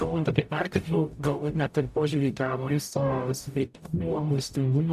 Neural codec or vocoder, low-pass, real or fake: codec, 44.1 kHz, 0.9 kbps, DAC; 14.4 kHz; fake